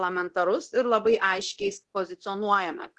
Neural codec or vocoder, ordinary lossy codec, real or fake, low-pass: codec, 24 kHz, 0.9 kbps, DualCodec; Opus, 16 kbps; fake; 10.8 kHz